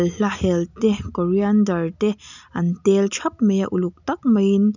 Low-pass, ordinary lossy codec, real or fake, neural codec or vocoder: 7.2 kHz; none; real; none